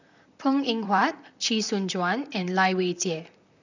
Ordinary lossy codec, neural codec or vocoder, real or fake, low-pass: none; vocoder, 44.1 kHz, 128 mel bands, Pupu-Vocoder; fake; 7.2 kHz